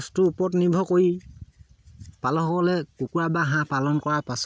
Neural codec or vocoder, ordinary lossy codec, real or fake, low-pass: none; none; real; none